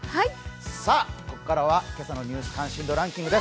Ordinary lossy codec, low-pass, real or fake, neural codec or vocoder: none; none; real; none